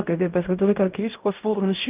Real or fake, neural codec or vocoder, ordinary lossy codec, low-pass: fake; codec, 16 kHz in and 24 kHz out, 0.4 kbps, LongCat-Audio-Codec, fine tuned four codebook decoder; Opus, 24 kbps; 3.6 kHz